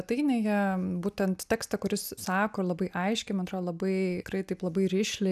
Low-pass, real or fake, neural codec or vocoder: 14.4 kHz; real; none